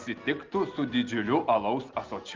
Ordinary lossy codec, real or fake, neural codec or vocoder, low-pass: Opus, 32 kbps; real; none; 7.2 kHz